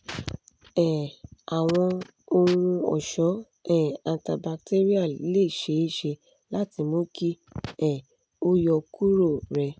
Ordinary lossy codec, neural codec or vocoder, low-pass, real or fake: none; none; none; real